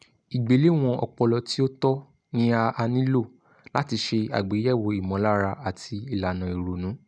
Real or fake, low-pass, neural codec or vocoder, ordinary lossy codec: real; 9.9 kHz; none; none